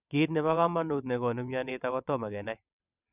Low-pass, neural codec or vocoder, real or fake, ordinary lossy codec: 3.6 kHz; vocoder, 22.05 kHz, 80 mel bands, WaveNeXt; fake; none